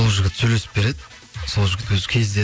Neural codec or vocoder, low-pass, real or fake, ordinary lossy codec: none; none; real; none